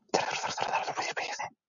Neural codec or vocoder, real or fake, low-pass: none; real; 7.2 kHz